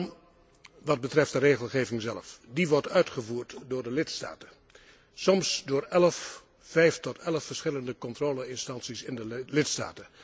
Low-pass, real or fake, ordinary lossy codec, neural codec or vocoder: none; real; none; none